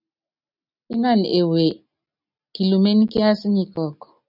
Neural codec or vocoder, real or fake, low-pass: none; real; 5.4 kHz